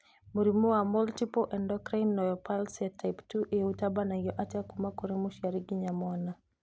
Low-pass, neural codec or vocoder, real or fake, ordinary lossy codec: none; none; real; none